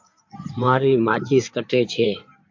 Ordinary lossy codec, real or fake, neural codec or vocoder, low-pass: MP3, 64 kbps; fake; codec, 16 kHz in and 24 kHz out, 2.2 kbps, FireRedTTS-2 codec; 7.2 kHz